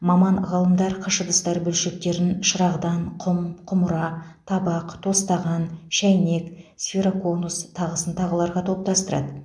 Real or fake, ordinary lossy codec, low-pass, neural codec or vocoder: real; none; none; none